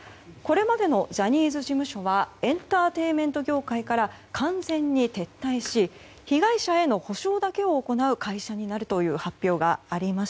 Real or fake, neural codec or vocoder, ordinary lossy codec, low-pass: real; none; none; none